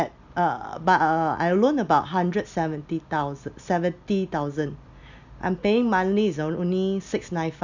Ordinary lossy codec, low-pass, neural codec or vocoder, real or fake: none; 7.2 kHz; none; real